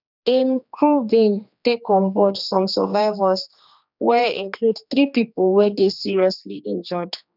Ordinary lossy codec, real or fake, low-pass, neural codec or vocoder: none; fake; 5.4 kHz; codec, 16 kHz, 2 kbps, X-Codec, HuBERT features, trained on general audio